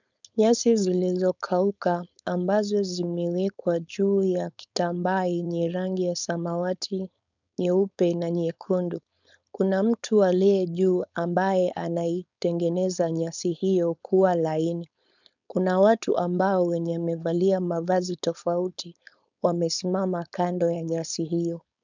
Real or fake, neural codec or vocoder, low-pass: fake; codec, 16 kHz, 4.8 kbps, FACodec; 7.2 kHz